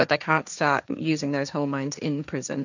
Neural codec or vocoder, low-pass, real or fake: codec, 16 kHz, 1.1 kbps, Voila-Tokenizer; 7.2 kHz; fake